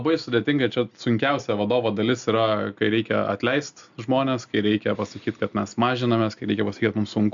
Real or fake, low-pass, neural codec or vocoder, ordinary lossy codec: real; 7.2 kHz; none; MP3, 64 kbps